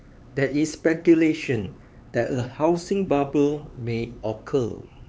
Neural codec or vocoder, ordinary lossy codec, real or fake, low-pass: codec, 16 kHz, 4 kbps, X-Codec, HuBERT features, trained on LibriSpeech; none; fake; none